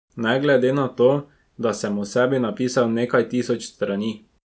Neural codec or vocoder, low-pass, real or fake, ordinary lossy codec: none; none; real; none